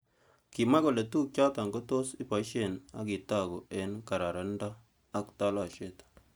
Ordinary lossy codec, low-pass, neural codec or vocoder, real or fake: none; none; none; real